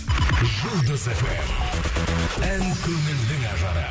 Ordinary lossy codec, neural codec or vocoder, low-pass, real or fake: none; none; none; real